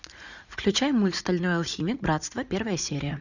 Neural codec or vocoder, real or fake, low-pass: vocoder, 44.1 kHz, 80 mel bands, Vocos; fake; 7.2 kHz